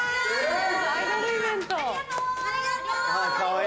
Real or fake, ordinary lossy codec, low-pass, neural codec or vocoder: real; none; none; none